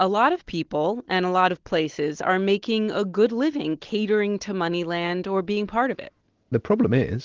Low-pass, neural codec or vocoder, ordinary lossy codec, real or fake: 7.2 kHz; none; Opus, 16 kbps; real